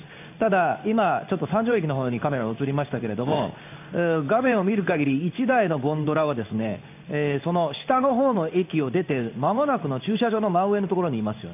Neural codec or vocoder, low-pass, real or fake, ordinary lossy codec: codec, 16 kHz in and 24 kHz out, 1 kbps, XY-Tokenizer; 3.6 kHz; fake; AAC, 32 kbps